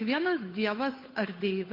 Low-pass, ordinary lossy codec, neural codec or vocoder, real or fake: 5.4 kHz; MP3, 32 kbps; codec, 16 kHz, 8 kbps, FunCodec, trained on Chinese and English, 25 frames a second; fake